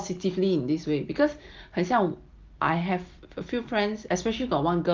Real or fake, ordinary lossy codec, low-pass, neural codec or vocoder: real; Opus, 32 kbps; 7.2 kHz; none